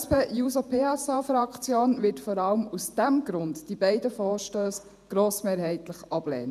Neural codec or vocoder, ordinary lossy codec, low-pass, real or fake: vocoder, 48 kHz, 128 mel bands, Vocos; none; 14.4 kHz; fake